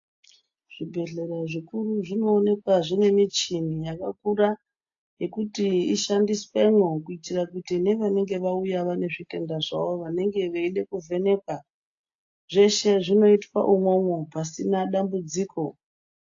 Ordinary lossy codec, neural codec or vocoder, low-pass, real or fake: AAC, 48 kbps; none; 7.2 kHz; real